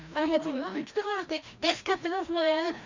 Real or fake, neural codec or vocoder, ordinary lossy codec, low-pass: fake; codec, 16 kHz, 1 kbps, FreqCodec, larger model; Opus, 64 kbps; 7.2 kHz